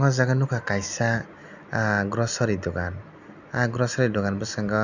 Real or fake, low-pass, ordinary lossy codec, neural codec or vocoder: real; 7.2 kHz; none; none